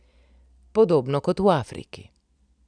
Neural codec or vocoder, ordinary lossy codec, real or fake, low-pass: none; none; real; 9.9 kHz